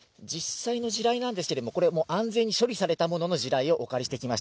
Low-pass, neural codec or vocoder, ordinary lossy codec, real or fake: none; none; none; real